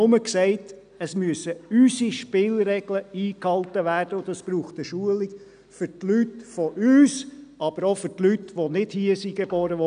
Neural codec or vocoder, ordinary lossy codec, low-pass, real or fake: none; none; 9.9 kHz; real